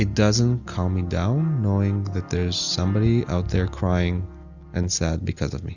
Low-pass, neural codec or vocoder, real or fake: 7.2 kHz; none; real